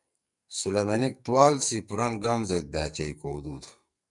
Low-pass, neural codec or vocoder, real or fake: 10.8 kHz; codec, 44.1 kHz, 2.6 kbps, SNAC; fake